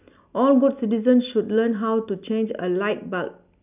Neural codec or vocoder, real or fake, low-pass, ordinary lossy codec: none; real; 3.6 kHz; none